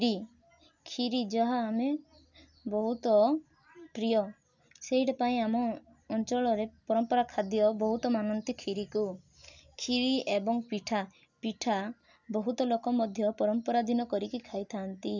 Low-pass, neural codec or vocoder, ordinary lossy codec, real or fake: 7.2 kHz; none; none; real